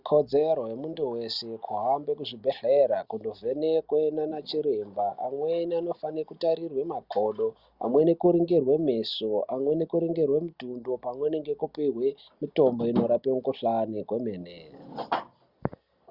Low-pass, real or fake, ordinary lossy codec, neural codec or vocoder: 5.4 kHz; real; Opus, 64 kbps; none